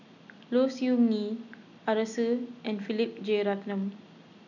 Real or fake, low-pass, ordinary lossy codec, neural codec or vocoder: real; 7.2 kHz; none; none